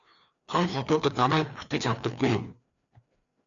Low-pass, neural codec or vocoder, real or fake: 7.2 kHz; codec, 16 kHz, 2 kbps, FreqCodec, larger model; fake